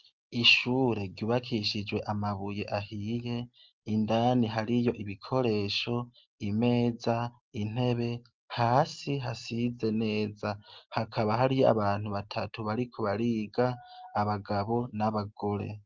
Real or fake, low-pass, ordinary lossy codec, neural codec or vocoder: real; 7.2 kHz; Opus, 24 kbps; none